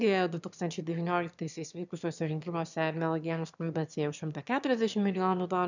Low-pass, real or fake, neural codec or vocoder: 7.2 kHz; fake; autoencoder, 22.05 kHz, a latent of 192 numbers a frame, VITS, trained on one speaker